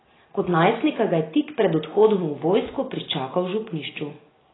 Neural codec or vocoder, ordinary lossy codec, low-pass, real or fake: none; AAC, 16 kbps; 7.2 kHz; real